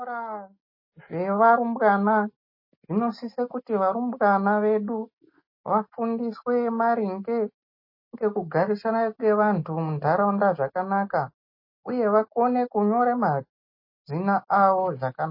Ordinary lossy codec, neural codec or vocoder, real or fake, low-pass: MP3, 24 kbps; none; real; 5.4 kHz